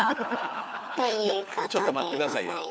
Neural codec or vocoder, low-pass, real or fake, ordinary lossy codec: codec, 16 kHz, 4 kbps, FunCodec, trained on LibriTTS, 50 frames a second; none; fake; none